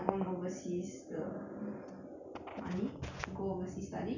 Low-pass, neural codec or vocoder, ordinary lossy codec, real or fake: 7.2 kHz; none; none; real